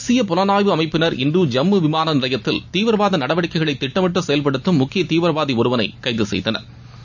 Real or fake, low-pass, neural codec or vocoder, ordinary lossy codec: real; 7.2 kHz; none; none